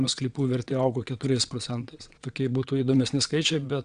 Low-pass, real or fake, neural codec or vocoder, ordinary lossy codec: 9.9 kHz; fake; vocoder, 22.05 kHz, 80 mel bands, WaveNeXt; Opus, 64 kbps